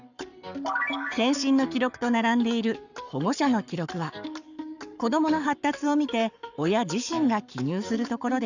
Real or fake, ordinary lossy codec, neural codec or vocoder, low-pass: fake; none; codec, 44.1 kHz, 7.8 kbps, Pupu-Codec; 7.2 kHz